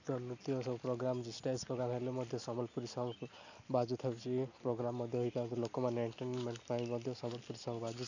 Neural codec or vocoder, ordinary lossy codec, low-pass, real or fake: none; none; 7.2 kHz; real